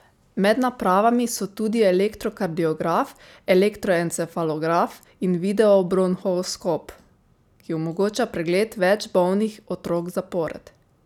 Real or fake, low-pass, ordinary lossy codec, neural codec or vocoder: real; 19.8 kHz; none; none